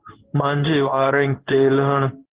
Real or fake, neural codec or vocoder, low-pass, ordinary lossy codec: fake; codec, 16 kHz in and 24 kHz out, 1 kbps, XY-Tokenizer; 3.6 kHz; Opus, 16 kbps